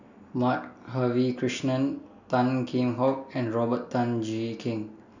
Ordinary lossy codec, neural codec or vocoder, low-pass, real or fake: none; none; 7.2 kHz; real